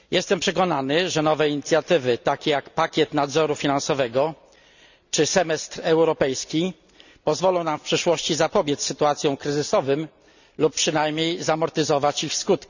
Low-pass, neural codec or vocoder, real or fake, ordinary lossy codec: 7.2 kHz; none; real; none